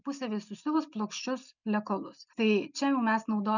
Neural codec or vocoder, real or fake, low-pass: none; real; 7.2 kHz